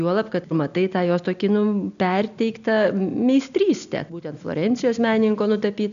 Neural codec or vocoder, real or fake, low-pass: none; real; 7.2 kHz